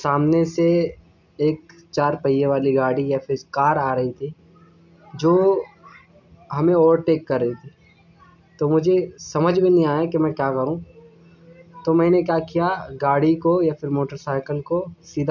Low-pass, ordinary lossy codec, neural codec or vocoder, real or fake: 7.2 kHz; none; none; real